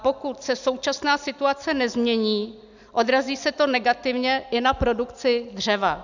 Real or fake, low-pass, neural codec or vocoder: real; 7.2 kHz; none